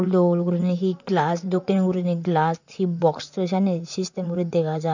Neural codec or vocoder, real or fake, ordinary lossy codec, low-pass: vocoder, 44.1 kHz, 128 mel bands, Pupu-Vocoder; fake; none; 7.2 kHz